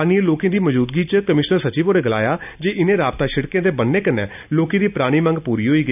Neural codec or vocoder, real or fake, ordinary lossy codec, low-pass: none; real; none; 3.6 kHz